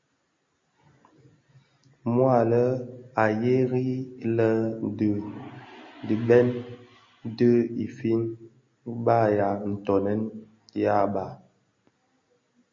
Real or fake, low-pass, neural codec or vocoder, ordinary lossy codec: real; 7.2 kHz; none; MP3, 32 kbps